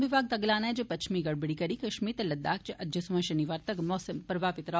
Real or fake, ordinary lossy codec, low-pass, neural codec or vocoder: real; none; none; none